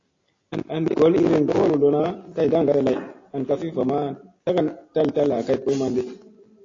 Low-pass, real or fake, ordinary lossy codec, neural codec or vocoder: 7.2 kHz; real; AAC, 32 kbps; none